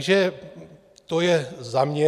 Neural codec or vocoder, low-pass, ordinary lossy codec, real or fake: none; 14.4 kHz; AAC, 96 kbps; real